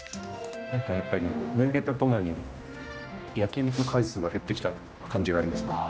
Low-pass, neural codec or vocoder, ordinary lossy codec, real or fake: none; codec, 16 kHz, 1 kbps, X-Codec, HuBERT features, trained on general audio; none; fake